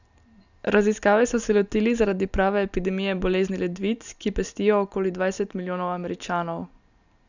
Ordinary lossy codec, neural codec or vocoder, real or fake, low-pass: none; none; real; 7.2 kHz